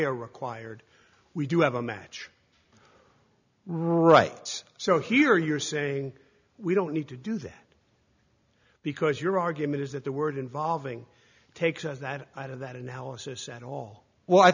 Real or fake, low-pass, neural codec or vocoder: real; 7.2 kHz; none